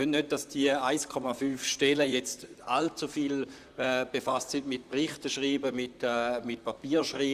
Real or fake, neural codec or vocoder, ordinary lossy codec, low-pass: fake; vocoder, 44.1 kHz, 128 mel bands, Pupu-Vocoder; Opus, 64 kbps; 14.4 kHz